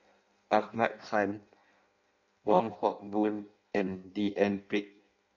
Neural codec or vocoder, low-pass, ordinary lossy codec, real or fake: codec, 16 kHz in and 24 kHz out, 0.6 kbps, FireRedTTS-2 codec; 7.2 kHz; none; fake